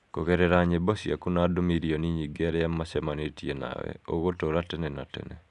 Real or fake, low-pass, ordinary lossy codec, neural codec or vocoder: real; 10.8 kHz; none; none